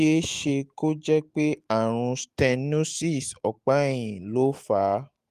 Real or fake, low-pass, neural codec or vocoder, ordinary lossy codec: fake; 14.4 kHz; autoencoder, 48 kHz, 128 numbers a frame, DAC-VAE, trained on Japanese speech; Opus, 24 kbps